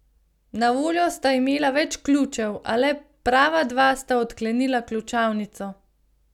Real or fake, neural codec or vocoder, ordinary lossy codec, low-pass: real; none; none; 19.8 kHz